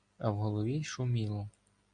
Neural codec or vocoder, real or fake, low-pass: none; real; 9.9 kHz